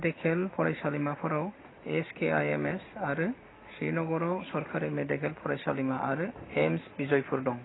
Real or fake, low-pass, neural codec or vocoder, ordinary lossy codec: real; 7.2 kHz; none; AAC, 16 kbps